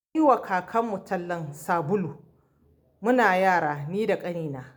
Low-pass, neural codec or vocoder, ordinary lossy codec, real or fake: none; none; none; real